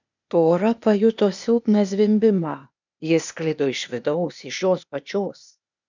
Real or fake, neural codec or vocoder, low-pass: fake; codec, 16 kHz, 0.8 kbps, ZipCodec; 7.2 kHz